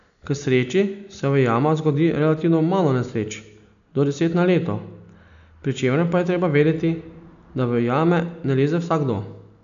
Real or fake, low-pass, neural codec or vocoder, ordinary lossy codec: real; 7.2 kHz; none; none